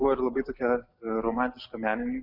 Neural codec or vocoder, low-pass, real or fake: none; 5.4 kHz; real